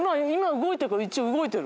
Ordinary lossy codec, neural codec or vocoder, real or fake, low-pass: none; none; real; none